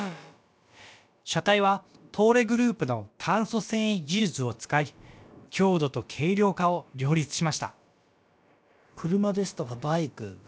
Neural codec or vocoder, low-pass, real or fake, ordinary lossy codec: codec, 16 kHz, about 1 kbps, DyCAST, with the encoder's durations; none; fake; none